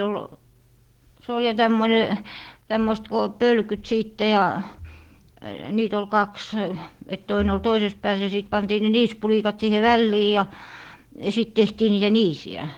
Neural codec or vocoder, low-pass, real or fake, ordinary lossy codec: codec, 44.1 kHz, 7.8 kbps, DAC; 19.8 kHz; fake; Opus, 16 kbps